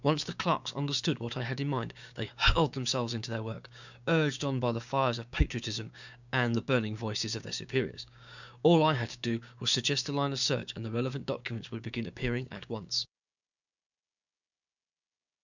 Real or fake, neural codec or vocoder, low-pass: fake; codec, 16 kHz, 6 kbps, DAC; 7.2 kHz